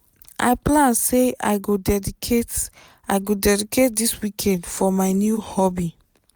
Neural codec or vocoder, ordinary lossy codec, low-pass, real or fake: none; none; none; real